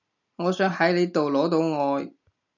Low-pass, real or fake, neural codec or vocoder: 7.2 kHz; real; none